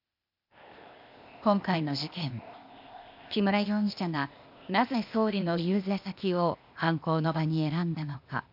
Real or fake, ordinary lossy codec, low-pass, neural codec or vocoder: fake; none; 5.4 kHz; codec, 16 kHz, 0.8 kbps, ZipCodec